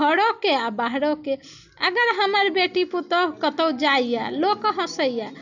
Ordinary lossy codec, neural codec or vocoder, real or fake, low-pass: none; none; real; 7.2 kHz